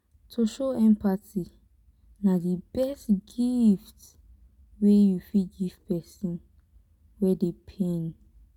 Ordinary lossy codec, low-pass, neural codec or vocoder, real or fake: none; 19.8 kHz; none; real